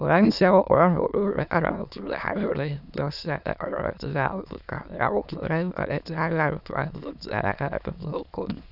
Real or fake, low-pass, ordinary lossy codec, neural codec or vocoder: fake; 5.4 kHz; none; autoencoder, 22.05 kHz, a latent of 192 numbers a frame, VITS, trained on many speakers